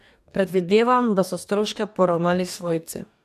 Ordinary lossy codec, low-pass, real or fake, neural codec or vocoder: none; 14.4 kHz; fake; codec, 44.1 kHz, 2.6 kbps, DAC